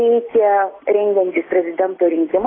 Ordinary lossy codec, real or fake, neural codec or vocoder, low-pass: AAC, 16 kbps; real; none; 7.2 kHz